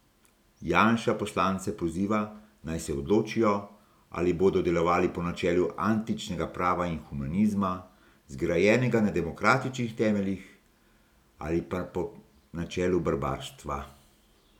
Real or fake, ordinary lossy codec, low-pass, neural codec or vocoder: real; none; 19.8 kHz; none